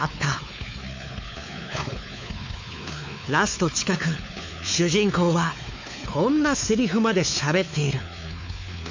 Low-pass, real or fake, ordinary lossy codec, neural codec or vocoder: 7.2 kHz; fake; MP3, 64 kbps; codec, 16 kHz, 4 kbps, X-Codec, WavLM features, trained on Multilingual LibriSpeech